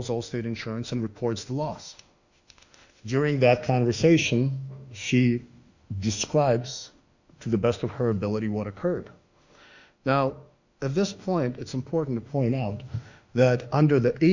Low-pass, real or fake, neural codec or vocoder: 7.2 kHz; fake; autoencoder, 48 kHz, 32 numbers a frame, DAC-VAE, trained on Japanese speech